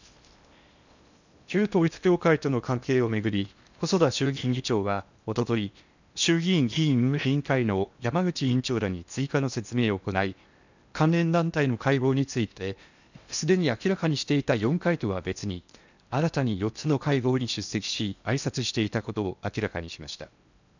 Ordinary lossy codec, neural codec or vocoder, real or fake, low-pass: none; codec, 16 kHz in and 24 kHz out, 0.8 kbps, FocalCodec, streaming, 65536 codes; fake; 7.2 kHz